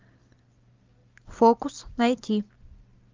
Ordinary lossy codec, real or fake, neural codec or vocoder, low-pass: Opus, 24 kbps; real; none; 7.2 kHz